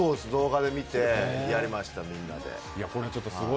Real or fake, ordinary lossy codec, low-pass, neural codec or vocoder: real; none; none; none